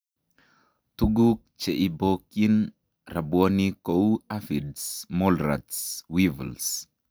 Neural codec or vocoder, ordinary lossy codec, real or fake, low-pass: none; none; real; none